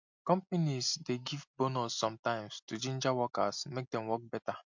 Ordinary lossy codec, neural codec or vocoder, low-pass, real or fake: MP3, 64 kbps; none; 7.2 kHz; real